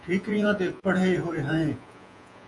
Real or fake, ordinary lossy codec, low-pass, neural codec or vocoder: fake; AAC, 64 kbps; 10.8 kHz; vocoder, 48 kHz, 128 mel bands, Vocos